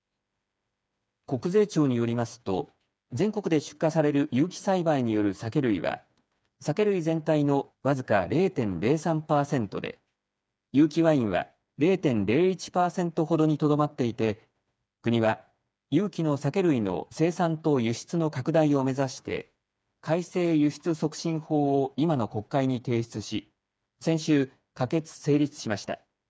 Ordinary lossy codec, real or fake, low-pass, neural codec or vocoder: none; fake; none; codec, 16 kHz, 4 kbps, FreqCodec, smaller model